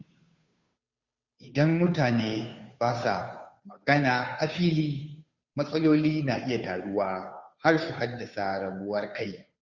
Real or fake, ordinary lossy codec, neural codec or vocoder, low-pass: fake; Opus, 64 kbps; codec, 16 kHz, 2 kbps, FunCodec, trained on Chinese and English, 25 frames a second; 7.2 kHz